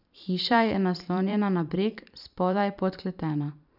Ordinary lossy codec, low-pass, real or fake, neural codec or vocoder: none; 5.4 kHz; fake; vocoder, 44.1 kHz, 128 mel bands every 512 samples, BigVGAN v2